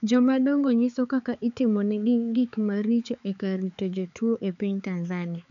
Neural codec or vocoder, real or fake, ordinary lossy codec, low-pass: codec, 16 kHz, 4 kbps, X-Codec, HuBERT features, trained on balanced general audio; fake; none; 7.2 kHz